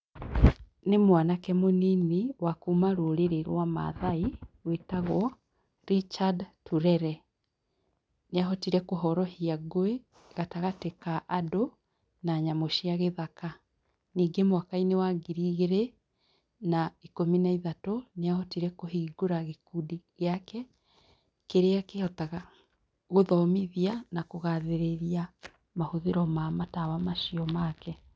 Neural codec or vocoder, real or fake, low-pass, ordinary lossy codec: none; real; none; none